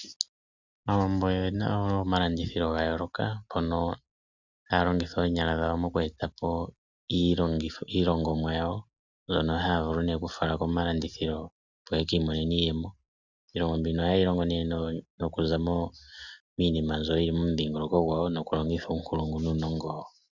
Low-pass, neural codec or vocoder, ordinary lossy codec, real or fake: 7.2 kHz; none; Opus, 64 kbps; real